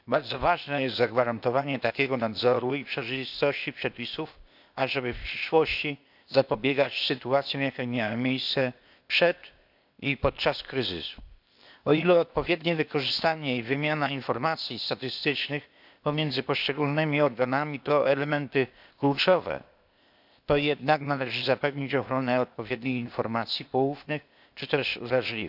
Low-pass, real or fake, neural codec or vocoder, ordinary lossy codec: 5.4 kHz; fake; codec, 16 kHz, 0.8 kbps, ZipCodec; none